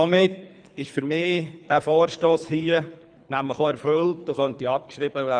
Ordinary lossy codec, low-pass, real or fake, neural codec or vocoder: none; 9.9 kHz; fake; codec, 24 kHz, 3 kbps, HILCodec